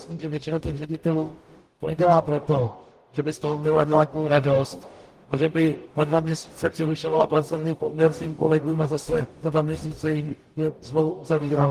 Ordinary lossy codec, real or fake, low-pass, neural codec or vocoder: Opus, 24 kbps; fake; 14.4 kHz; codec, 44.1 kHz, 0.9 kbps, DAC